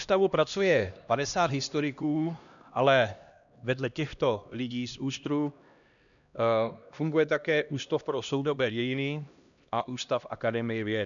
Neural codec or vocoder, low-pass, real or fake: codec, 16 kHz, 1 kbps, X-Codec, HuBERT features, trained on LibriSpeech; 7.2 kHz; fake